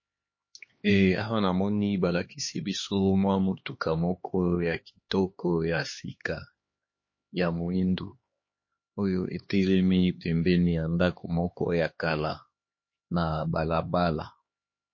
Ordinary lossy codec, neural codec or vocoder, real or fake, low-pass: MP3, 32 kbps; codec, 16 kHz, 2 kbps, X-Codec, HuBERT features, trained on LibriSpeech; fake; 7.2 kHz